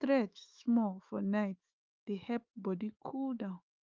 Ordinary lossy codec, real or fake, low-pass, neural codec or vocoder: Opus, 24 kbps; fake; 7.2 kHz; autoencoder, 48 kHz, 128 numbers a frame, DAC-VAE, trained on Japanese speech